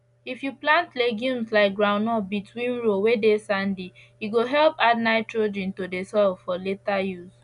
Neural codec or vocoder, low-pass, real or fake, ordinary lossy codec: none; 10.8 kHz; real; none